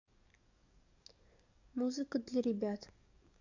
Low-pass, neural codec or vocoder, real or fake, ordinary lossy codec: 7.2 kHz; codec, 44.1 kHz, 7.8 kbps, DAC; fake; AAC, 48 kbps